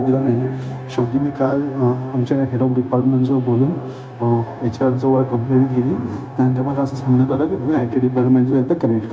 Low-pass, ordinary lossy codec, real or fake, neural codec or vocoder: none; none; fake; codec, 16 kHz, 0.9 kbps, LongCat-Audio-Codec